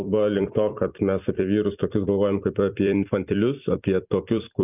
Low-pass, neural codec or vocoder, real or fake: 3.6 kHz; vocoder, 44.1 kHz, 128 mel bands every 256 samples, BigVGAN v2; fake